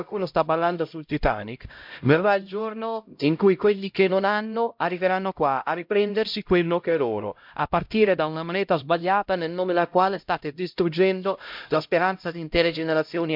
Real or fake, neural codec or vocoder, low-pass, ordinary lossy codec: fake; codec, 16 kHz, 0.5 kbps, X-Codec, HuBERT features, trained on LibriSpeech; 5.4 kHz; MP3, 48 kbps